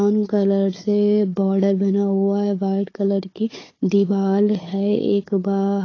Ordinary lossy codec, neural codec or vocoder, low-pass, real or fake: AAC, 32 kbps; codec, 16 kHz, 4 kbps, FunCodec, trained on Chinese and English, 50 frames a second; 7.2 kHz; fake